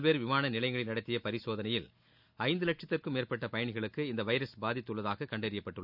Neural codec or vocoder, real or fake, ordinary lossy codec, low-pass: none; real; none; 5.4 kHz